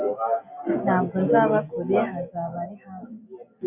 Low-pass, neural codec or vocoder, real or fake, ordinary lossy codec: 3.6 kHz; none; real; MP3, 32 kbps